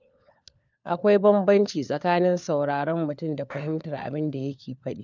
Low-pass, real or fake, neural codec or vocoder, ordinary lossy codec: 7.2 kHz; fake; codec, 16 kHz, 4 kbps, FunCodec, trained on LibriTTS, 50 frames a second; none